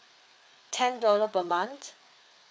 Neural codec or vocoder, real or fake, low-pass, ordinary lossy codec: codec, 16 kHz, 4 kbps, FreqCodec, larger model; fake; none; none